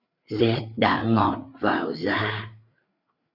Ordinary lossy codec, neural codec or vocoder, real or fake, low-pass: Opus, 64 kbps; codec, 16 kHz, 4 kbps, FreqCodec, larger model; fake; 5.4 kHz